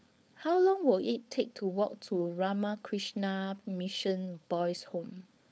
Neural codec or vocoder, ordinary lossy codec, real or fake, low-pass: codec, 16 kHz, 4.8 kbps, FACodec; none; fake; none